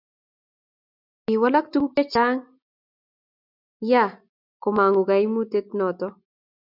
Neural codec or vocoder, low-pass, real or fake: none; 5.4 kHz; real